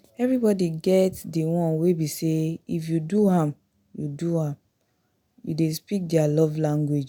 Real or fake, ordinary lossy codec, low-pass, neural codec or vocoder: real; none; none; none